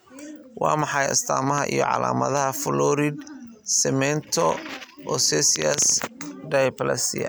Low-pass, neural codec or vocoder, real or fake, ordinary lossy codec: none; none; real; none